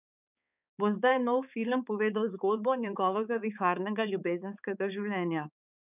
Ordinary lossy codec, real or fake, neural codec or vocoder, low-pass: none; fake; codec, 16 kHz, 4 kbps, X-Codec, HuBERT features, trained on balanced general audio; 3.6 kHz